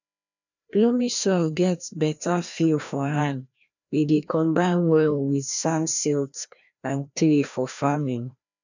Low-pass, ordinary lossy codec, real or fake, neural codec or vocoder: 7.2 kHz; none; fake; codec, 16 kHz, 1 kbps, FreqCodec, larger model